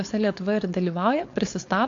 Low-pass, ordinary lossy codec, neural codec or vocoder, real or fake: 7.2 kHz; AAC, 48 kbps; codec, 16 kHz, 4.8 kbps, FACodec; fake